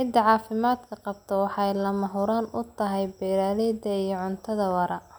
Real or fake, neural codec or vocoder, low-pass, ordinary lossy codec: real; none; none; none